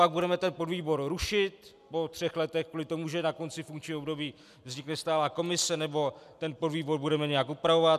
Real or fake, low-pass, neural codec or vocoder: real; 14.4 kHz; none